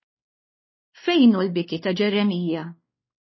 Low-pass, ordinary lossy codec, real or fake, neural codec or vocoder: 7.2 kHz; MP3, 24 kbps; fake; codec, 16 kHz, 2 kbps, X-Codec, WavLM features, trained on Multilingual LibriSpeech